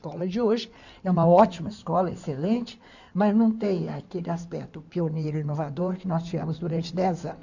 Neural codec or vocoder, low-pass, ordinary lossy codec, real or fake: codec, 16 kHz in and 24 kHz out, 2.2 kbps, FireRedTTS-2 codec; 7.2 kHz; none; fake